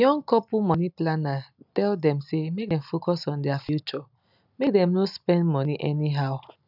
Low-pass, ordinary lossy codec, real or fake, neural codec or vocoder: 5.4 kHz; none; real; none